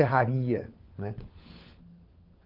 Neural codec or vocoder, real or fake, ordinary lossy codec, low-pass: codec, 16 kHz, 4 kbps, FunCodec, trained on LibriTTS, 50 frames a second; fake; Opus, 32 kbps; 5.4 kHz